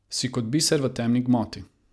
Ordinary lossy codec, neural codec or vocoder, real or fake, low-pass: none; none; real; none